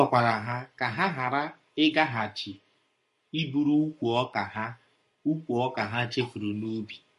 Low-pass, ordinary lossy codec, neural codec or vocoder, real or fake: 14.4 kHz; MP3, 48 kbps; codec, 44.1 kHz, 7.8 kbps, Pupu-Codec; fake